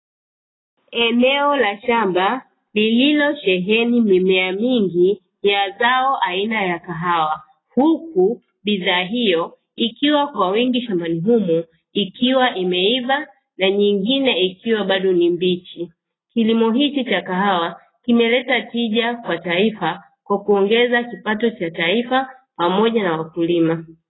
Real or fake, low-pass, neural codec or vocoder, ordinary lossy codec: real; 7.2 kHz; none; AAC, 16 kbps